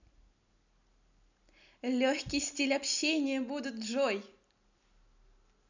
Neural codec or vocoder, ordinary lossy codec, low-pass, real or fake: none; none; 7.2 kHz; real